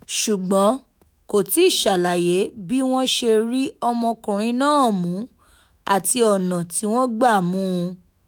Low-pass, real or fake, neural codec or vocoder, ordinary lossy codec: none; fake; autoencoder, 48 kHz, 128 numbers a frame, DAC-VAE, trained on Japanese speech; none